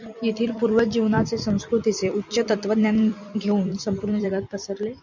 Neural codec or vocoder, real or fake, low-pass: vocoder, 44.1 kHz, 128 mel bands every 256 samples, BigVGAN v2; fake; 7.2 kHz